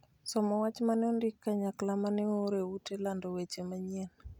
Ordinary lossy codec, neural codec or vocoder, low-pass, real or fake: none; none; 19.8 kHz; real